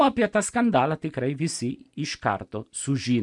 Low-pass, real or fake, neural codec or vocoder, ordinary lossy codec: 10.8 kHz; real; none; MP3, 96 kbps